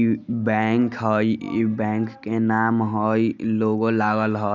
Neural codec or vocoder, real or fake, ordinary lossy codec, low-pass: none; real; none; 7.2 kHz